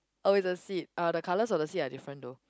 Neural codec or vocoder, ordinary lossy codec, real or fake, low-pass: none; none; real; none